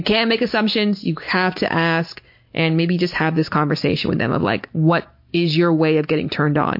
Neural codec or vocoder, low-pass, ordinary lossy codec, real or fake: none; 5.4 kHz; MP3, 32 kbps; real